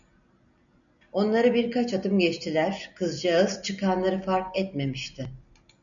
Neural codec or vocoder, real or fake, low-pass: none; real; 7.2 kHz